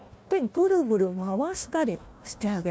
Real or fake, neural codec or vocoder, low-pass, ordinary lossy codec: fake; codec, 16 kHz, 1 kbps, FunCodec, trained on Chinese and English, 50 frames a second; none; none